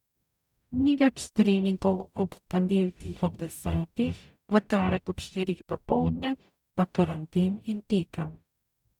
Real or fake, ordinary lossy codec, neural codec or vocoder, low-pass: fake; none; codec, 44.1 kHz, 0.9 kbps, DAC; 19.8 kHz